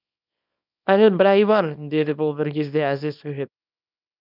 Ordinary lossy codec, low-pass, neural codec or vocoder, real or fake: none; 5.4 kHz; codec, 24 kHz, 0.9 kbps, WavTokenizer, small release; fake